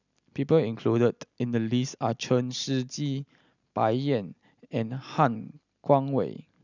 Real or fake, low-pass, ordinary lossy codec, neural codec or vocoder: real; 7.2 kHz; none; none